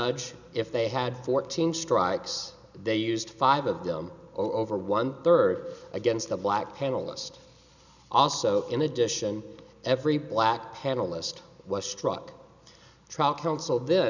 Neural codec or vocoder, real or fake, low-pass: none; real; 7.2 kHz